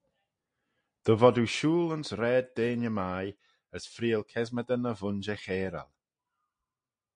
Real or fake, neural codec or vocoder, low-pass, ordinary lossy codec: real; none; 9.9 kHz; MP3, 48 kbps